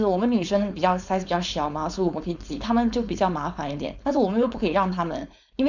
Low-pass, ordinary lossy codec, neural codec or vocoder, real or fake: 7.2 kHz; none; codec, 16 kHz, 4.8 kbps, FACodec; fake